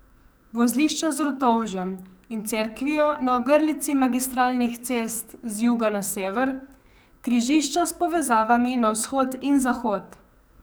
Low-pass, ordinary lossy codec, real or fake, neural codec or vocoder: none; none; fake; codec, 44.1 kHz, 2.6 kbps, SNAC